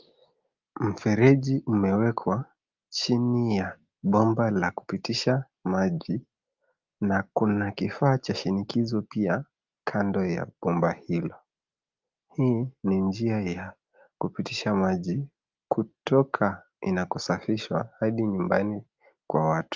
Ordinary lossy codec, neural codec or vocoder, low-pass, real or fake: Opus, 32 kbps; none; 7.2 kHz; real